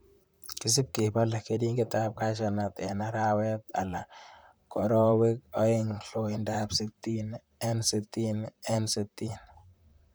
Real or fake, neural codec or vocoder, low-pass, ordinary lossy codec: fake; vocoder, 44.1 kHz, 128 mel bands, Pupu-Vocoder; none; none